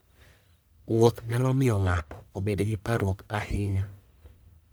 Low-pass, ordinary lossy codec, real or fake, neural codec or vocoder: none; none; fake; codec, 44.1 kHz, 1.7 kbps, Pupu-Codec